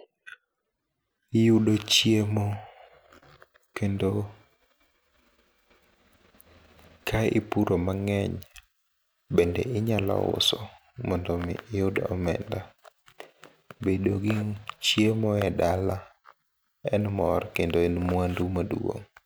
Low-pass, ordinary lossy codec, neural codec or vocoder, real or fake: none; none; none; real